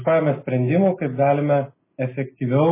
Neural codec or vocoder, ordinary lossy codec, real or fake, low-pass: none; MP3, 16 kbps; real; 3.6 kHz